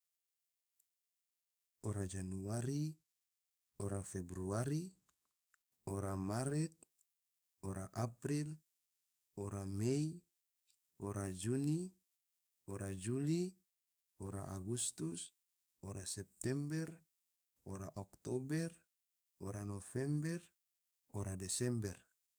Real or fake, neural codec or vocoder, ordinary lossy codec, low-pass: fake; codec, 44.1 kHz, 7.8 kbps, DAC; none; none